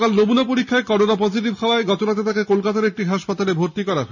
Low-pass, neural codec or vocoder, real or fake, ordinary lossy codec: 7.2 kHz; none; real; none